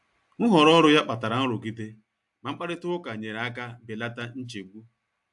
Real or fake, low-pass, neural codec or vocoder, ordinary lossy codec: real; 10.8 kHz; none; AAC, 64 kbps